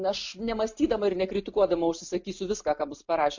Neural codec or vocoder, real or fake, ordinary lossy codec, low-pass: none; real; MP3, 48 kbps; 7.2 kHz